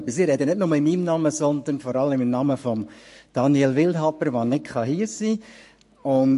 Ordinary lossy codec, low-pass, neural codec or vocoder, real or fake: MP3, 48 kbps; 14.4 kHz; codec, 44.1 kHz, 7.8 kbps, Pupu-Codec; fake